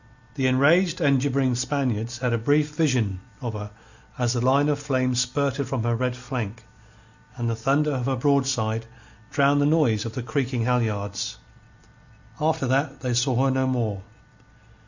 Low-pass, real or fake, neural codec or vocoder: 7.2 kHz; real; none